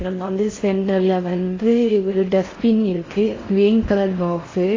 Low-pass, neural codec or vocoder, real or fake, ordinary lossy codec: 7.2 kHz; codec, 16 kHz in and 24 kHz out, 0.6 kbps, FocalCodec, streaming, 4096 codes; fake; AAC, 32 kbps